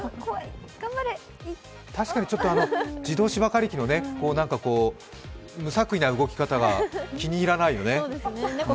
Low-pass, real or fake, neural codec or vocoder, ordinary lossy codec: none; real; none; none